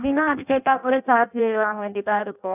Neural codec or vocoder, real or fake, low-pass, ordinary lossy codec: codec, 16 kHz in and 24 kHz out, 0.6 kbps, FireRedTTS-2 codec; fake; 3.6 kHz; none